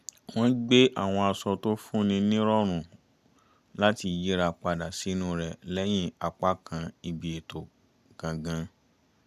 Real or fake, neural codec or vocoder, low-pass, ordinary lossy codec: real; none; 14.4 kHz; none